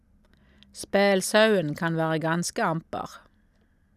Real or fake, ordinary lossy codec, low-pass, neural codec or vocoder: real; none; 14.4 kHz; none